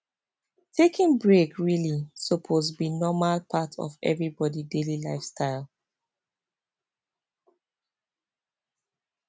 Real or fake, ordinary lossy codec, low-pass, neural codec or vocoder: real; none; none; none